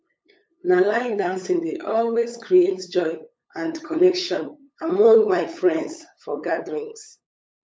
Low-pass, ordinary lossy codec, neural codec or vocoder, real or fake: none; none; codec, 16 kHz, 8 kbps, FunCodec, trained on LibriTTS, 25 frames a second; fake